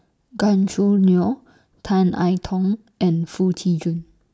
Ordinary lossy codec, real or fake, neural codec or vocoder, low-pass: none; real; none; none